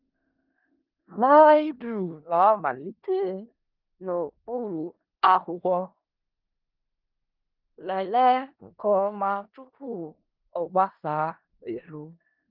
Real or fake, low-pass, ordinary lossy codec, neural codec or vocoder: fake; 5.4 kHz; Opus, 32 kbps; codec, 16 kHz in and 24 kHz out, 0.4 kbps, LongCat-Audio-Codec, four codebook decoder